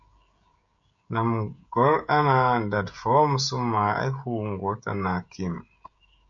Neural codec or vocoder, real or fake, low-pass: codec, 16 kHz, 16 kbps, FreqCodec, smaller model; fake; 7.2 kHz